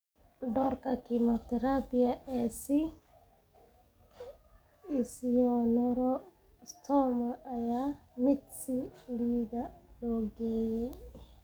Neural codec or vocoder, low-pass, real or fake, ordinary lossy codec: codec, 44.1 kHz, 7.8 kbps, DAC; none; fake; none